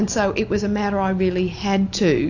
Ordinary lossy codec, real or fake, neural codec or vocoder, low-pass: AAC, 48 kbps; real; none; 7.2 kHz